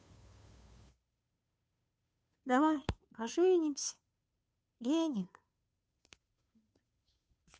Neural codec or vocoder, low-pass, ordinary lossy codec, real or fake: codec, 16 kHz, 2 kbps, FunCodec, trained on Chinese and English, 25 frames a second; none; none; fake